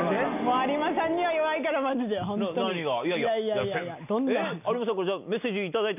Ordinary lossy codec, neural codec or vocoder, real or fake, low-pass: none; none; real; 3.6 kHz